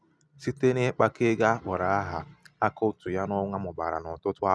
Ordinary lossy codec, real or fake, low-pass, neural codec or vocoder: none; real; none; none